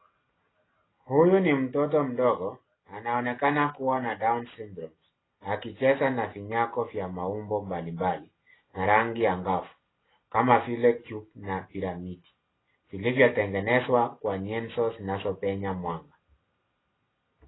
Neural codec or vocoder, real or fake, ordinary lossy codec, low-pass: none; real; AAC, 16 kbps; 7.2 kHz